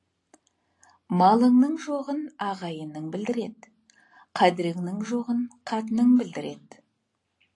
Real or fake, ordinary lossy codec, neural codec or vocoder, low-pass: real; AAC, 48 kbps; none; 10.8 kHz